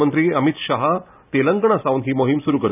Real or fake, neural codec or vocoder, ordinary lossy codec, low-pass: real; none; none; 3.6 kHz